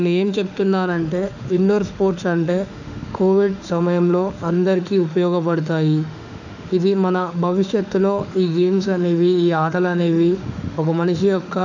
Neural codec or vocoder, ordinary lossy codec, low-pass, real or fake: autoencoder, 48 kHz, 32 numbers a frame, DAC-VAE, trained on Japanese speech; none; 7.2 kHz; fake